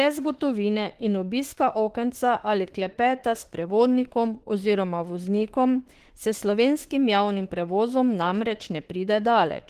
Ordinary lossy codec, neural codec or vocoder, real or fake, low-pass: Opus, 16 kbps; autoencoder, 48 kHz, 32 numbers a frame, DAC-VAE, trained on Japanese speech; fake; 14.4 kHz